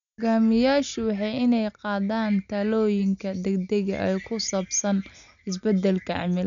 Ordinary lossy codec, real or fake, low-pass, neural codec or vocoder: none; real; 7.2 kHz; none